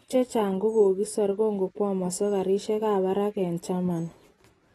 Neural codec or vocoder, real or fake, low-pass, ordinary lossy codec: none; real; 19.8 kHz; AAC, 32 kbps